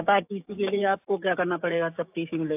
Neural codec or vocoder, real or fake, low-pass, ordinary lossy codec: codec, 16 kHz, 6 kbps, DAC; fake; 3.6 kHz; AAC, 32 kbps